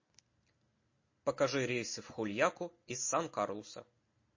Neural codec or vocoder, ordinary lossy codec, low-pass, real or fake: none; MP3, 32 kbps; 7.2 kHz; real